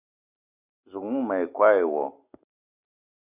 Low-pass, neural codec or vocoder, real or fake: 3.6 kHz; none; real